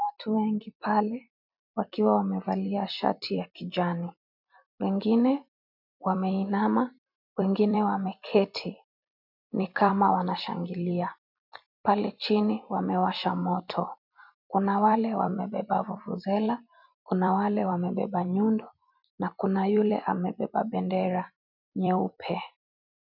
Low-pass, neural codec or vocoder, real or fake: 5.4 kHz; vocoder, 44.1 kHz, 128 mel bands every 256 samples, BigVGAN v2; fake